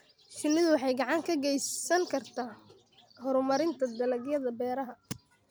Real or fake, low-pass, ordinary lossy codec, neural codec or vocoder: real; none; none; none